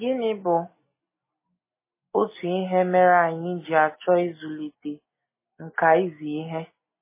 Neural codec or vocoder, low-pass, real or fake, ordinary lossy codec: none; 3.6 kHz; real; MP3, 16 kbps